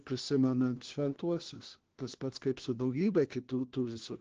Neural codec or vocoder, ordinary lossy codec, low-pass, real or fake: codec, 16 kHz, 1 kbps, FunCodec, trained on LibriTTS, 50 frames a second; Opus, 16 kbps; 7.2 kHz; fake